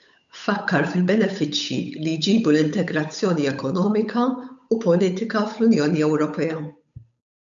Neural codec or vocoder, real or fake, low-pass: codec, 16 kHz, 8 kbps, FunCodec, trained on Chinese and English, 25 frames a second; fake; 7.2 kHz